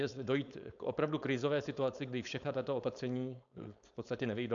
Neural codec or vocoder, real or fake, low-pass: codec, 16 kHz, 4.8 kbps, FACodec; fake; 7.2 kHz